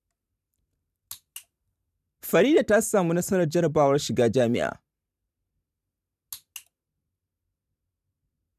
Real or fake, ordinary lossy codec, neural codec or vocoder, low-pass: fake; none; vocoder, 44.1 kHz, 128 mel bands every 512 samples, BigVGAN v2; 14.4 kHz